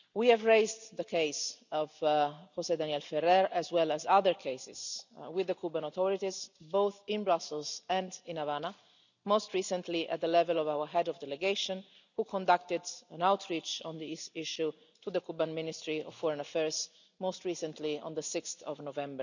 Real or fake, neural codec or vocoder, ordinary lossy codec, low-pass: real; none; none; 7.2 kHz